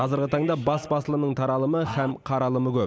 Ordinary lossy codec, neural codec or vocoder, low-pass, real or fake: none; none; none; real